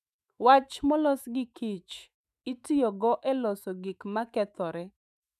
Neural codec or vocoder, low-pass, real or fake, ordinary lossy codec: autoencoder, 48 kHz, 128 numbers a frame, DAC-VAE, trained on Japanese speech; 14.4 kHz; fake; none